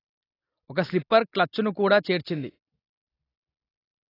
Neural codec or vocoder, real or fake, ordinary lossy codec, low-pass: none; real; AAC, 24 kbps; 5.4 kHz